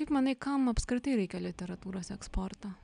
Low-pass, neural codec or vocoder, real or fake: 9.9 kHz; none; real